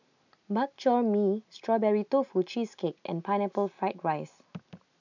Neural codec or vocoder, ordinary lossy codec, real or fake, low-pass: none; none; real; 7.2 kHz